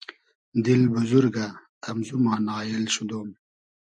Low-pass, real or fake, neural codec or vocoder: 9.9 kHz; real; none